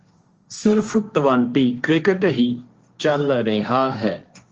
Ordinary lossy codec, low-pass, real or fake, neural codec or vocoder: Opus, 24 kbps; 7.2 kHz; fake; codec, 16 kHz, 1.1 kbps, Voila-Tokenizer